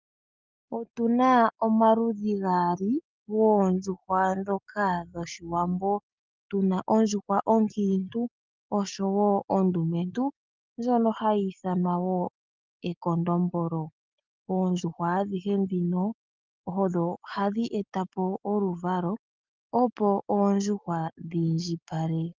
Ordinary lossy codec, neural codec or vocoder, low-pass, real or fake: Opus, 32 kbps; none; 7.2 kHz; real